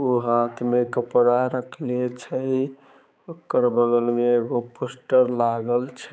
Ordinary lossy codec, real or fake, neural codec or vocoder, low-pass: none; fake; codec, 16 kHz, 4 kbps, X-Codec, HuBERT features, trained on balanced general audio; none